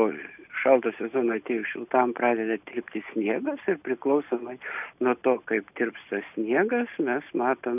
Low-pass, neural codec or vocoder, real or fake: 3.6 kHz; none; real